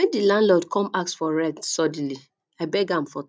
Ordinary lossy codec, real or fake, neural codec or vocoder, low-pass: none; real; none; none